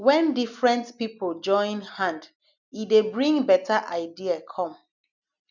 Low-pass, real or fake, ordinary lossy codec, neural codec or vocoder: 7.2 kHz; real; none; none